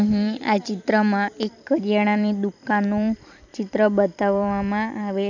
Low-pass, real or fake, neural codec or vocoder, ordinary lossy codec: 7.2 kHz; fake; vocoder, 44.1 kHz, 128 mel bands every 256 samples, BigVGAN v2; none